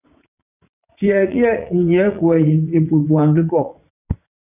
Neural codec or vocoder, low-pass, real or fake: vocoder, 44.1 kHz, 80 mel bands, Vocos; 3.6 kHz; fake